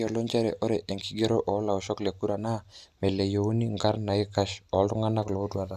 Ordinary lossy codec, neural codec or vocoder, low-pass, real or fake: none; none; 14.4 kHz; real